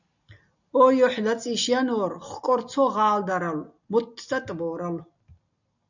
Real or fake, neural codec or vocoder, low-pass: real; none; 7.2 kHz